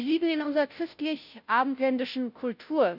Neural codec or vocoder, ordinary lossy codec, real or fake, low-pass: codec, 16 kHz, 0.5 kbps, FunCodec, trained on Chinese and English, 25 frames a second; none; fake; 5.4 kHz